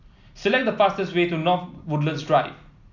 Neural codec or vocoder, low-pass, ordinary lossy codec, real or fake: none; 7.2 kHz; none; real